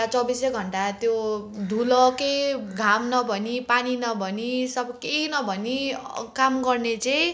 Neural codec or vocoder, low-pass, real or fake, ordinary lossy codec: none; none; real; none